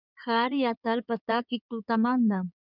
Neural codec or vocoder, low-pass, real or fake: codec, 16 kHz in and 24 kHz out, 2.2 kbps, FireRedTTS-2 codec; 5.4 kHz; fake